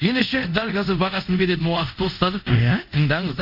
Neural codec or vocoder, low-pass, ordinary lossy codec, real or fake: codec, 24 kHz, 0.5 kbps, DualCodec; 5.4 kHz; none; fake